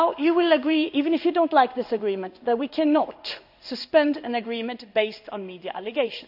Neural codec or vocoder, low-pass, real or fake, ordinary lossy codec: codec, 16 kHz in and 24 kHz out, 1 kbps, XY-Tokenizer; 5.4 kHz; fake; none